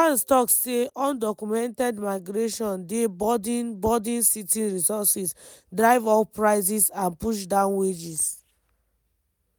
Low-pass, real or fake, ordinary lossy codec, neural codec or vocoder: none; real; none; none